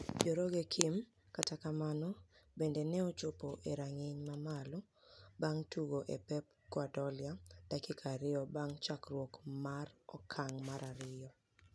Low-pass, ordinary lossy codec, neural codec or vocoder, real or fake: none; none; none; real